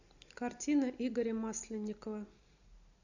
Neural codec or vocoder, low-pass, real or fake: none; 7.2 kHz; real